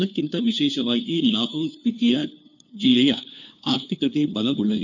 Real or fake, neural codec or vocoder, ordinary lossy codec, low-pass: fake; codec, 16 kHz, 2 kbps, FunCodec, trained on LibriTTS, 25 frames a second; none; 7.2 kHz